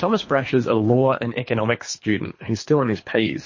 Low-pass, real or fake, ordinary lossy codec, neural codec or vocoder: 7.2 kHz; fake; MP3, 32 kbps; codec, 24 kHz, 3 kbps, HILCodec